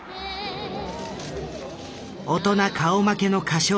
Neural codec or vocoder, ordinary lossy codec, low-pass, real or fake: none; none; none; real